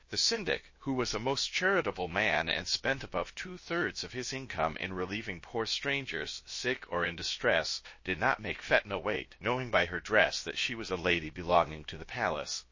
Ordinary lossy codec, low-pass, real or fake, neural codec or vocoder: MP3, 32 kbps; 7.2 kHz; fake; codec, 16 kHz, about 1 kbps, DyCAST, with the encoder's durations